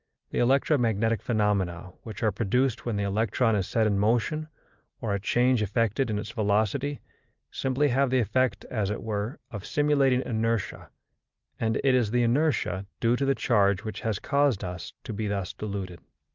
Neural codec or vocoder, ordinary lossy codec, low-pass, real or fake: none; Opus, 24 kbps; 7.2 kHz; real